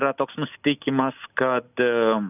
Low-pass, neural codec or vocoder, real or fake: 3.6 kHz; none; real